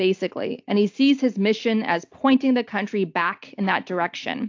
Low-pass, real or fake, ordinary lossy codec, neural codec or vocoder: 7.2 kHz; real; AAC, 48 kbps; none